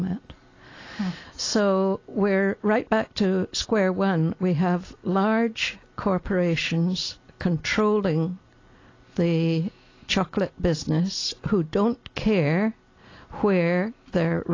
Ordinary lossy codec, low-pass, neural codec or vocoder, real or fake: AAC, 32 kbps; 7.2 kHz; none; real